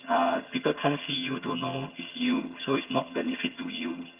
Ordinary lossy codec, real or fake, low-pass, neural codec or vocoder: Opus, 24 kbps; fake; 3.6 kHz; vocoder, 22.05 kHz, 80 mel bands, HiFi-GAN